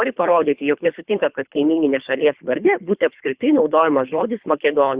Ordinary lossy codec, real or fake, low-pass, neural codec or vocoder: Opus, 64 kbps; fake; 3.6 kHz; codec, 24 kHz, 3 kbps, HILCodec